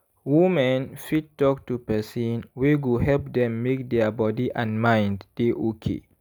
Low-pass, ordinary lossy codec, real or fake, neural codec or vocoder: none; none; real; none